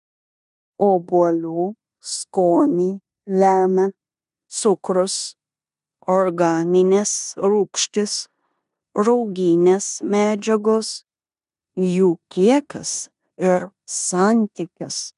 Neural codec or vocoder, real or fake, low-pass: codec, 16 kHz in and 24 kHz out, 0.9 kbps, LongCat-Audio-Codec, four codebook decoder; fake; 10.8 kHz